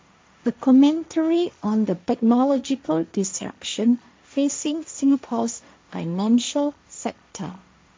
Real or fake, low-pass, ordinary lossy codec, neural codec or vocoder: fake; none; none; codec, 16 kHz, 1.1 kbps, Voila-Tokenizer